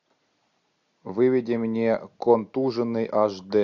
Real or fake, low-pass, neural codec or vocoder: real; 7.2 kHz; none